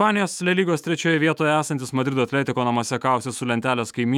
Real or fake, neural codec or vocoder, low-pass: fake; autoencoder, 48 kHz, 128 numbers a frame, DAC-VAE, trained on Japanese speech; 19.8 kHz